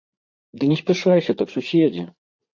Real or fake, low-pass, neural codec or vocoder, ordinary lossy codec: fake; 7.2 kHz; vocoder, 44.1 kHz, 80 mel bands, Vocos; AAC, 48 kbps